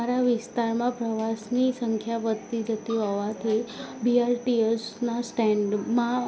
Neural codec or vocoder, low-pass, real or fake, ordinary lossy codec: none; none; real; none